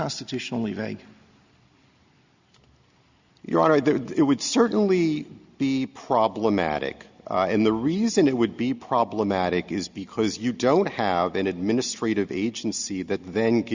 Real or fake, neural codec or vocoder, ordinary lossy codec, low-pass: real; none; Opus, 64 kbps; 7.2 kHz